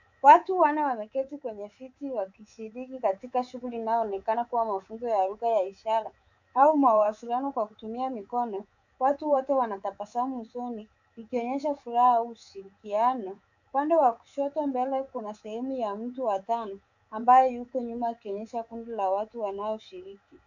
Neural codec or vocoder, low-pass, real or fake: codec, 24 kHz, 3.1 kbps, DualCodec; 7.2 kHz; fake